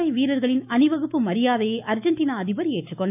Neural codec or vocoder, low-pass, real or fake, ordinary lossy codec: autoencoder, 48 kHz, 128 numbers a frame, DAC-VAE, trained on Japanese speech; 3.6 kHz; fake; none